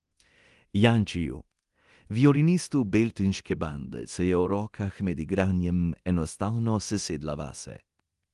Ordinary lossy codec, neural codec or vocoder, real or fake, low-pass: Opus, 24 kbps; codec, 24 kHz, 0.9 kbps, DualCodec; fake; 10.8 kHz